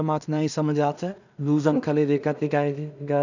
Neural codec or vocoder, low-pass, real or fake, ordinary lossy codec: codec, 16 kHz in and 24 kHz out, 0.4 kbps, LongCat-Audio-Codec, two codebook decoder; 7.2 kHz; fake; none